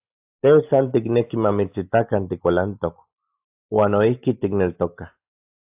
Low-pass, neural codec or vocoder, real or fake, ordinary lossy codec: 3.6 kHz; none; real; AAC, 32 kbps